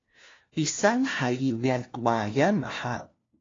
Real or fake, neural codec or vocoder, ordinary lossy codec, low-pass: fake; codec, 16 kHz, 1 kbps, FunCodec, trained on LibriTTS, 50 frames a second; AAC, 32 kbps; 7.2 kHz